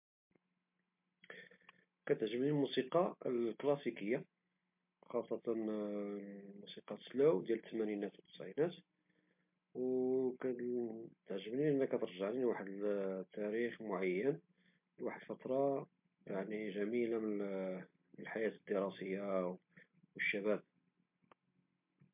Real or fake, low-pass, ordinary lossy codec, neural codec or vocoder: real; 3.6 kHz; MP3, 32 kbps; none